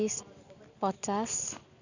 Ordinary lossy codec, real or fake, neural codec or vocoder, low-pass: none; real; none; 7.2 kHz